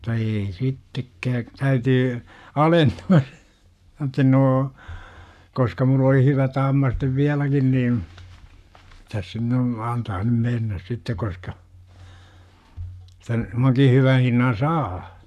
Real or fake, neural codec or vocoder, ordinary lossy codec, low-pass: fake; codec, 44.1 kHz, 7.8 kbps, Pupu-Codec; none; 14.4 kHz